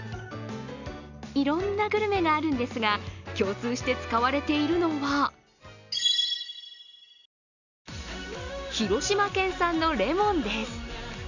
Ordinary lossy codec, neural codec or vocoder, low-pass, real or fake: none; none; 7.2 kHz; real